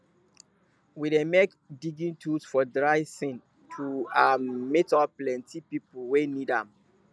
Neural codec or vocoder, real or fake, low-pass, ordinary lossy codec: none; real; none; none